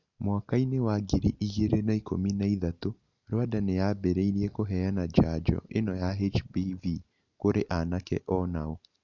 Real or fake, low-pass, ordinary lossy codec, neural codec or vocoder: real; 7.2 kHz; none; none